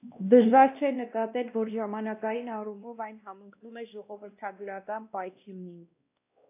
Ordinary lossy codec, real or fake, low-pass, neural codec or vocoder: AAC, 24 kbps; fake; 3.6 kHz; codec, 16 kHz, 1 kbps, X-Codec, WavLM features, trained on Multilingual LibriSpeech